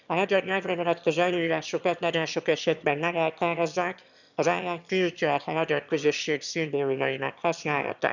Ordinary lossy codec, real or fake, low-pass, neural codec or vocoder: none; fake; 7.2 kHz; autoencoder, 22.05 kHz, a latent of 192 numbers a frame, VITS, trained on one speaker